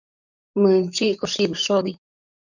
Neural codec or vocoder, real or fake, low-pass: codec, 44.1 kHz, 7.8 kbps, DAC; fake; 7.2 kHz